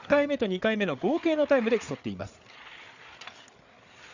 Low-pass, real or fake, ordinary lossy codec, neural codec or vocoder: 7.2 kHz; fake; Opus, 64 kbps; codec, 16 kHz, 8 kbps, FreqCodec, larger model